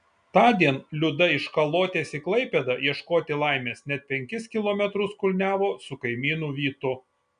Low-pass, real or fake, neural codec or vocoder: 9.9 kHz; real; none